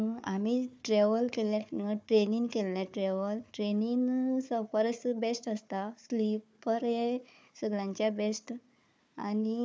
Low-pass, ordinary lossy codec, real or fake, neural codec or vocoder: none; none; fake; codec, 16 kHz, 4 kbps, FunCodec, trained on Chinese and English, 50 frames a second